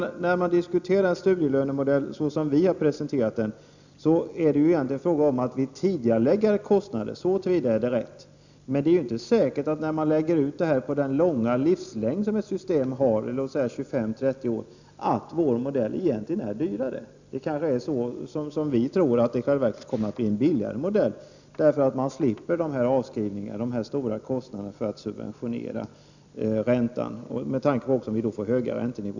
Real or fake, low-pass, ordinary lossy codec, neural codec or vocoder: real; 7.2 kHz; none; none